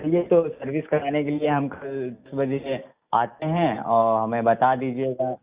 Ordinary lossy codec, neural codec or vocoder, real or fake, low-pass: Opus, 64 kbps; none; real; 3.6 kHz